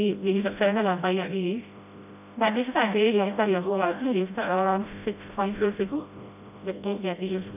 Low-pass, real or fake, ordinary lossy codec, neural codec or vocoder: 3.6 kHz; fake; none; codec, 16 kHz, 0.5 kbps, FreqCodec, smaller model